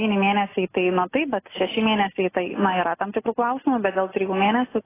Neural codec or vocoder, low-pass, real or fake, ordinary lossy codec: none; 3.6 kHz; real; AAC, 16 kbps